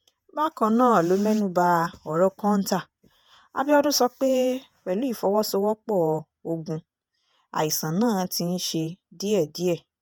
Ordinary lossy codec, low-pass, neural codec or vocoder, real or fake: none; none; vocoder, 48 kHz, 128 mel bands, Vocos; fake